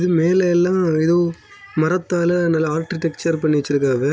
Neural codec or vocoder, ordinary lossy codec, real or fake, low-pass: none; none; real; none